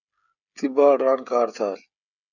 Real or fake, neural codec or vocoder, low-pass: fake; codec, 16 kHz, 16 kbps, FreqCodec, smaller model; 7.2 kHz